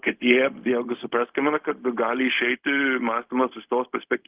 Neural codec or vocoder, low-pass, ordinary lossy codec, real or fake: codec, 16 kHz, 0.4 kbps, LongCat-Audio-Codec; 3.6 kHz; Opus, 32 kbps; fake